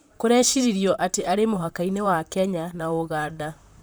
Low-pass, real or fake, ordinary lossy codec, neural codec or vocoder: none; fake; none; vocoder, 44.1 kHz, 128 mel bands, Pupu-Vocoder